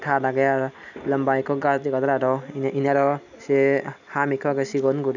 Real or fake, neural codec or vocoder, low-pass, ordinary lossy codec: real; none; 7.2 kHz; none